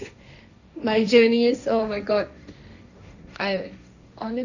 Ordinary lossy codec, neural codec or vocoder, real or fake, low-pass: none; codec, 16 kHz, 1.1 kbps, Voila-Tokenizer; fake; 7.2 kHz